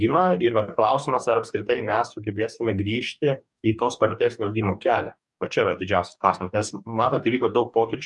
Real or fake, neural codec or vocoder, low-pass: fake; codec, 44.1 kHz, 2.6 kbps, DAC; 10.8 kHz